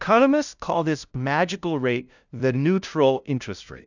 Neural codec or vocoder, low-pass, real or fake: codec, 16 kHz, 0.5 kbps, FunCodec, trained on LibriTTS, 25 frames a second; 7.2 kHz; fake